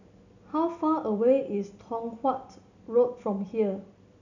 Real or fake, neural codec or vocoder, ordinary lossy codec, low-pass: real; none; none; 7.2 kHz